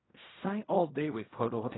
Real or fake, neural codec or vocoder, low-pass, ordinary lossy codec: fake; codec, 16 kHz in and 24 kHz out, 0.4 kbps, LongCat-Audio-Codec, fine tuned four codebook decoder; 7.2 kHz; AAC, 16 kbps